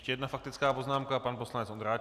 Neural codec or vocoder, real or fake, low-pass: none; real; 14.4 kHz